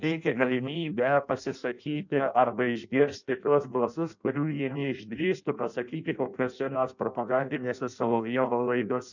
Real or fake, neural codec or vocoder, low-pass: fake; codec, 16 kHz in and 24 kHz out, 0.6 kbps, FireRedTTS-2 codec; 7.2 kHz